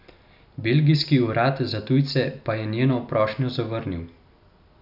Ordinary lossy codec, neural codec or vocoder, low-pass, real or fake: none; none; 5.4 kHz; real